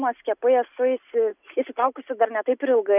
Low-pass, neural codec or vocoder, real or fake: 3.6 kHz; none; real